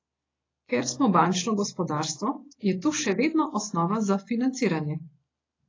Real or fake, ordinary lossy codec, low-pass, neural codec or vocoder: real; AAC, 32 kbps; 7.2 kHz; none